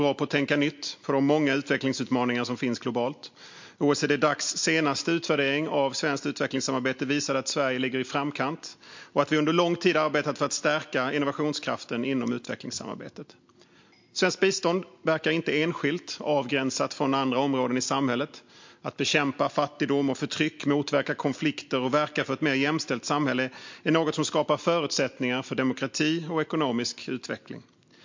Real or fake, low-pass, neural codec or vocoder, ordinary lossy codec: real; 7.2 kHz; none; MP3, 48 kbps